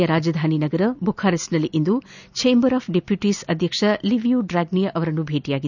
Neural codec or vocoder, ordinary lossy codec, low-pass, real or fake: none; none; 7.2 kHz; real